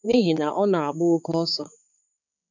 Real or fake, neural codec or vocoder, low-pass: fake; codec, 16 kHz, 4 kbps, X-Codec, HuBERT features, trained on balanced general audio; 7.2 kHz